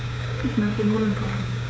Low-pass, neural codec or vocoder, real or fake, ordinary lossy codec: none; codec, 16 kHz, 6 kbps, DAC; fake; none